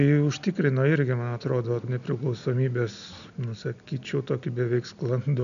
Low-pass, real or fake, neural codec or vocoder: 7.2 kHz; real; none